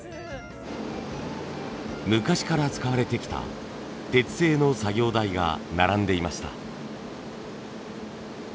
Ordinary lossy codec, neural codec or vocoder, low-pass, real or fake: none; none; none; real